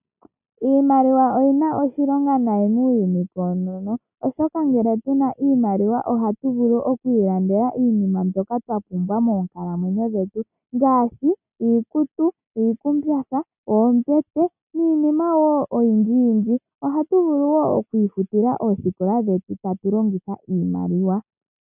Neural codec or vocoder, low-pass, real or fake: none; 3.6 kHz; real